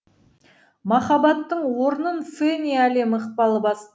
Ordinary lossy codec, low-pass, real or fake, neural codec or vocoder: none; none; real; none